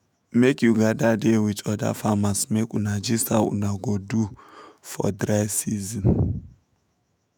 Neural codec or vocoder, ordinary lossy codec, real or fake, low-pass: autoencoder, 48 kHz, 128 numbers a frame, DAC-VAE, trained on Japanese speech; none; fake; none